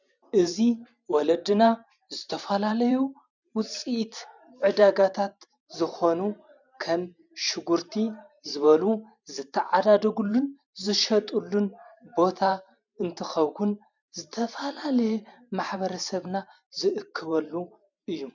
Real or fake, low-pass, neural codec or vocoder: fake; 7.2 kHz; vocoder, 44.1 kHz, 128 mel bands every 512 samples, BigVGAN v2